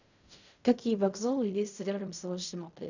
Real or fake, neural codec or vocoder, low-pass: fake; codec, 16 kHz in and 24 kHz out, 0.4 kbps, LongCat-Audio-Codec, fine tuned four codebook decoder; 7.2 kHz